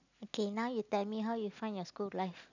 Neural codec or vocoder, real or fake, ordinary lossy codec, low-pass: none; real; none; 7.2 kHz